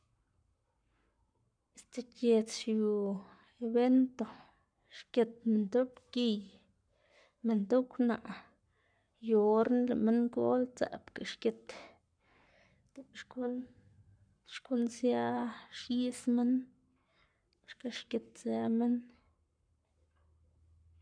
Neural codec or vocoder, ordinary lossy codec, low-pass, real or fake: codec, 44.1 kHz, 7.8 kbps, Pupu-Codec; none; 9.9 kHz; fake